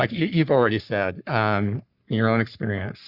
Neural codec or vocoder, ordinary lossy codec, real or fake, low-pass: codec, 44.1 kHz, 3.4 kbps, Pupu-Codec; Opus, 64 kbps; fake; 5.4 kHz